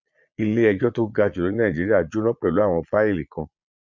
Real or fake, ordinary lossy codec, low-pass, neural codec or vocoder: fake; MP3, 48 kbps; 7.2 kHz; vocoder, 22.05 kHz, 80 mel bands, Vocos